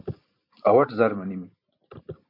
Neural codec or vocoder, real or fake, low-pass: none; real; 5.4 kHz